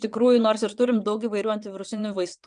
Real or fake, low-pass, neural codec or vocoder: fake; 9.9 kHz; vocoder, 22.05 kHz, 80 mel bands, WaveNeXt